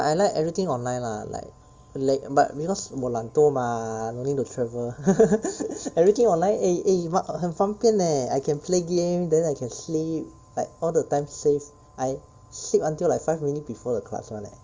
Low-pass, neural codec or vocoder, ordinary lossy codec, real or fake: 7.2 kHz; none; Opus, 32 kbps; real